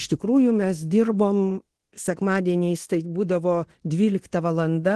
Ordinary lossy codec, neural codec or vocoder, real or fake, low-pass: Opus, 16 kbps; codec, 24 kHz, 0.9 kbps, DualCodec; fake; 10.8 kHz